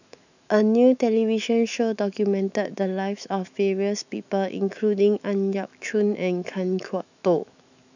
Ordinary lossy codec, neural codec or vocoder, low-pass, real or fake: none; autoencoder, 48 kHz, 128 numbers a frame, DAC-VAE, trained on Japanese speech; 7.2 kHz; fake